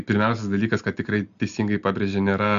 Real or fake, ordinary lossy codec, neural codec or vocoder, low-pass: real; MP3, 64 kbps; none; 7.2 kHz